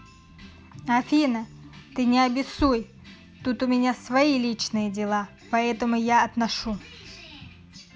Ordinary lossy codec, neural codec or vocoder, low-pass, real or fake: none; none; none; real